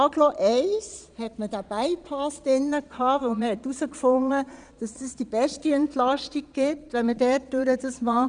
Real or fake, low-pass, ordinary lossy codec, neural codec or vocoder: fake; 9.9 kHz; none; vocoder, 22.05 kHz, 80 mel bands, Vocos